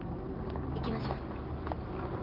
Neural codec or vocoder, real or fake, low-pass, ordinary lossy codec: none; real; 5.4 kHz; Opus, 16 kbps